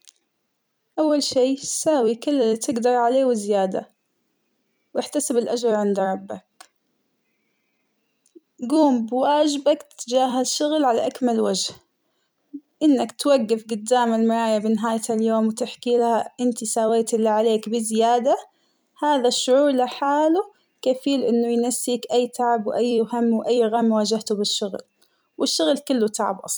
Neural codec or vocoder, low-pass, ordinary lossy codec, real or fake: vocoder, 44.1 kHz, 128 mel bands every 256 samples, BigVGAN v2; none; none; fake